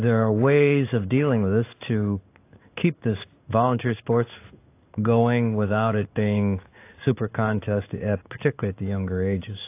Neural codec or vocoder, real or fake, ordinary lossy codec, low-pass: codec, 16 kHz in and 24 kHz out, 1 kbps, XY-Tokenizer; fake; AAC, 24 kbps; 3.6 kHz